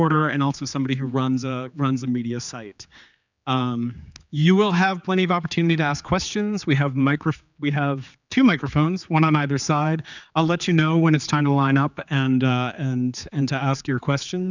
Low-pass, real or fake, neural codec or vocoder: 7.2 kHz; fake; codec, 16 kHz, 4 kbps, X-Codec, HuBERT features, trained on general audio